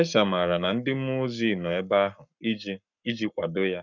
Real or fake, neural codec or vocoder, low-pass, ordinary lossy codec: fake; codec, 44.1 kHz, 7.8 kbps, Pupu-Codec; 7.2 kHz; none